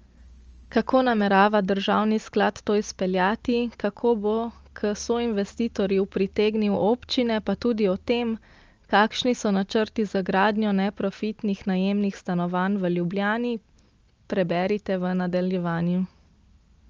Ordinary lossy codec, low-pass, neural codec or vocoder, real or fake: Opus, 24 kbps; 7.2 kHz; none; real